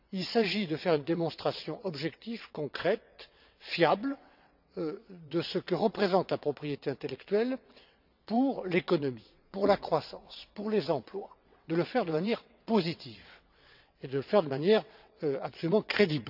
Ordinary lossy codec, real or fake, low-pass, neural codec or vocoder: none; fake; 5.4 kHz; vocoder, 22.05 kHz, 80 mel bands, WaveNeXt